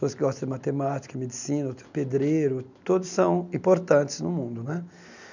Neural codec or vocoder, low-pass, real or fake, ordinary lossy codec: none; 7.2 kHz; real; none